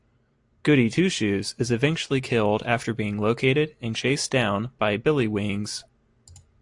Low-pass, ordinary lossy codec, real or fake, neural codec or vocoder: 10.8 kHz; AAC, 64 kbps; real; none